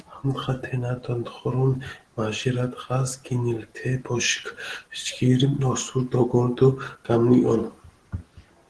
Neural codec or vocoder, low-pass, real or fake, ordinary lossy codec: none; 10.8 kHz; real; Opus, 16 kbps